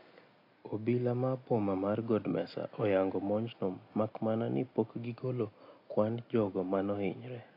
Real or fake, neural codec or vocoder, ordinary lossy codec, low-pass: real; none; AAC, 32 kbps; 5.4 kHz